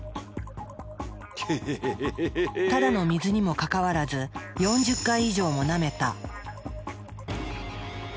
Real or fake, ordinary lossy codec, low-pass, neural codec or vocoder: real; none; none; none